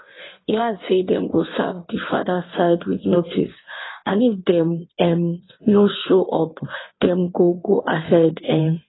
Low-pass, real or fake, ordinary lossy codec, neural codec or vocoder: 7.2 kHz; fake; AAC, 16 kbps; codec, 16 kHz in and 24 kHz out, 1.1 kbps, FireRedTTS-2 codec